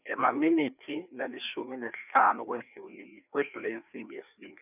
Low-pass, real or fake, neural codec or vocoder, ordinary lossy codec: 3.6 kHz; fake; codec, 16 kHz, 2 kbps, FreqCodec, larger model; none